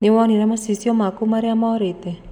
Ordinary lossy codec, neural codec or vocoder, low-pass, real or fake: none; none; 19.8 kHz; real